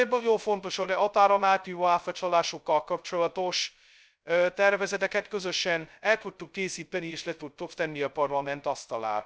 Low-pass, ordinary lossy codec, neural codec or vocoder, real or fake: none; none; codec, 16 kHz, 0.2 kbps, FocalCodec; fake